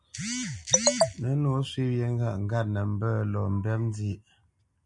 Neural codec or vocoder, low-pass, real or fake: none; 10.8 kHz; real